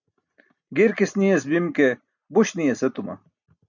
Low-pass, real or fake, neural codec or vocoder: 7.2 kHz; real; none